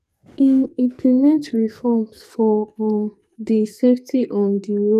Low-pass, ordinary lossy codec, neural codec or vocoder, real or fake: 14.4 kHz; none; codec, 44.1 kHz, 2.6 kbps, SNAC; fake